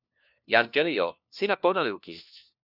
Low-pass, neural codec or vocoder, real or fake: 5.4 kHz; codec, 16 kHz, 1 kbps, FunCodec, trained on LibriTTS, 50 frames a second; fake